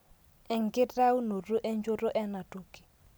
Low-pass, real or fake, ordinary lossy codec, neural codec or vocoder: none; real; none; none